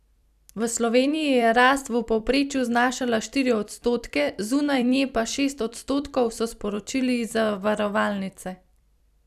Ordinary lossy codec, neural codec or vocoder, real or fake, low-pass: none; vocoder, 44.1 kHz, 128 mel bands every 256 samples, BigVGAN v2; fake; 14.4 kHz